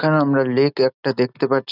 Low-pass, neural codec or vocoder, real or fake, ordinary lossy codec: 5.4 kHz; none; real; none